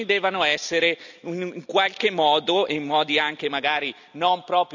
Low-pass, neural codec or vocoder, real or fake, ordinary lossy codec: 7.2 kHz; none; real; none